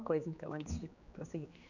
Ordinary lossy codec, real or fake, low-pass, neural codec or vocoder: none; fake; 7.2 kHz; codec, 16 kHz, 2 kbps, X-Codec, HuBERT features, trained on general audio